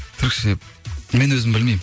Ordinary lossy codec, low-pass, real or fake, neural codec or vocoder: none; none; real; none